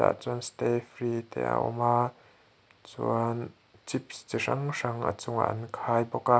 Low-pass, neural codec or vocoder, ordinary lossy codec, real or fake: none; none; none; real